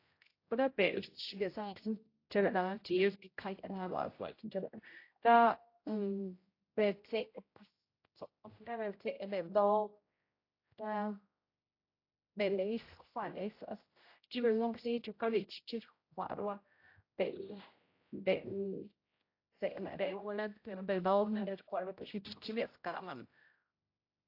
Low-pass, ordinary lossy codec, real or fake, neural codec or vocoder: 5.4 kHz; AAC, 32 kbps; fake; codec, 16 kHz, 0.5 kbps, X-Codec, HuBERT features, trained on general audio